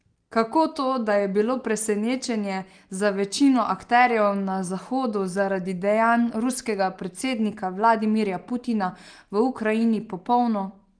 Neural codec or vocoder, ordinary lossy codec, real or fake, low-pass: none; Opus, 24 kbps; real; 9.9 kHz